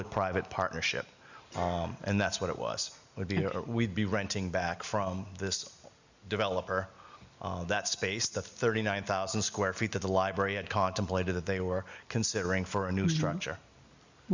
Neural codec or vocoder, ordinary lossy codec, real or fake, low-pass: none; Opus, 64 kbps; real; 7.2 kHz